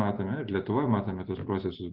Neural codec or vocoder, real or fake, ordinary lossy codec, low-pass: none; real; Opus, 16 kbps; 5.4 kHz